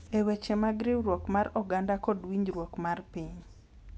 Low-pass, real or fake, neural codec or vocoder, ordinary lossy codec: none; real; none; none